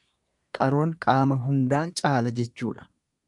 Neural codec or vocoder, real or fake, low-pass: codec, 24 kHz, 1 kbps, SNAC; fake; 10.8 kHz